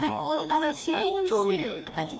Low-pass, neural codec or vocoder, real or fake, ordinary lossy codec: none; codec, 16 kHz, 1 kbps, FreqCodec, larger model; fake; none